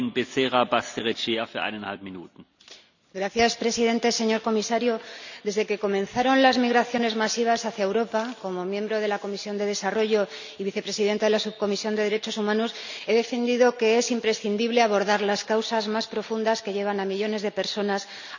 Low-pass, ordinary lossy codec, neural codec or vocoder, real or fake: 7.2 kHz; none; none; real